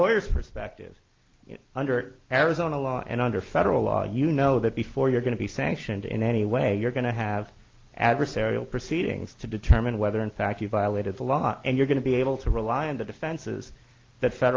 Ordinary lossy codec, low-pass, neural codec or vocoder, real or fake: Opus, 32 kbps; 7.2 kHz; none; real